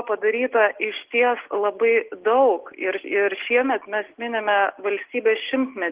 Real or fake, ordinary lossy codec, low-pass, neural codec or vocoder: real; Opus, 16 kbps; 3.6 kHz; none